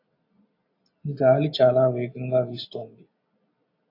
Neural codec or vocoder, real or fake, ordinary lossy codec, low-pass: none; real; AAC, 32 kbps; 5.4 kHz